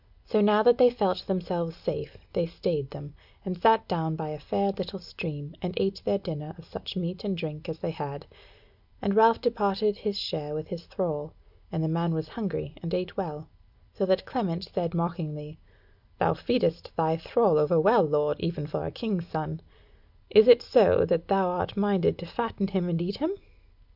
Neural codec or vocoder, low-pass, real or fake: none; 5.4 kHz; real